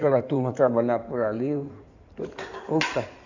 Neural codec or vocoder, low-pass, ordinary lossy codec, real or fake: codec, 16 kHz in and 24 kHz out, 2.2 kbps, FireRedTTS-2 codec; 7.2 kHz; none; fake